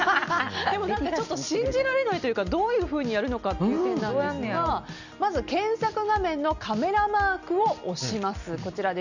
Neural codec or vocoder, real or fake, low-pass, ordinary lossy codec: none; real; 7.2 kHz; none